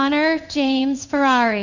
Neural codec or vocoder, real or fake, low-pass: codec, 16 kHz in and 24 kHz out, 1 kbps, XY-Tokenizer; fake; 7.2 kHz